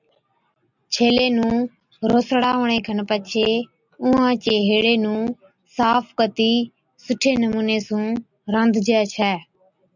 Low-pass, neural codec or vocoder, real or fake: 7.2 kHz; none; real